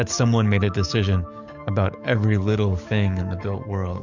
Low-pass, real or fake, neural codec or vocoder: 7.2 kHz; fake; codec, 44.1 kHz, 7.8 kbps, DAC